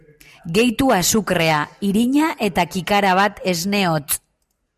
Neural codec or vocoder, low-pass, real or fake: none; 14.4 kHz; real